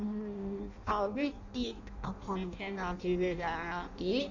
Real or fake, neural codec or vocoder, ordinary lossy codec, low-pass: fake; codec, 16 kHz in and 24 kHz out, 0.6 kbps, FireRedTTS-2 codec; Opus, 64 kbps; 7.2 kHz